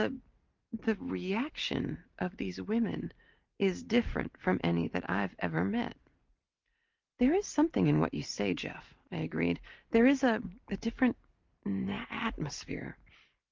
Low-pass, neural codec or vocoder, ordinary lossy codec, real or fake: 7.2 kHz; none; Opus, 32 kbps; real